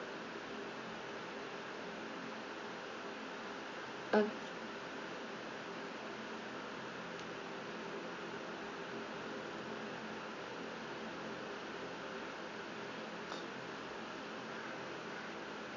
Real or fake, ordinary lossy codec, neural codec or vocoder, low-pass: real; AAC, 32 kbps; none; 7.2 kHz